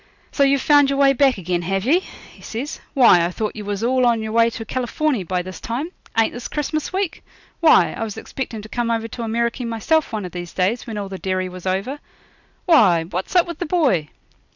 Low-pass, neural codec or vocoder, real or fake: 7.2 kHz; none; real